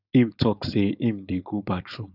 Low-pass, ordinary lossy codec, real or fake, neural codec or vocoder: 5.4 kHz; none; fake; codec, 16 kHz, 4.8 kbps, FACodec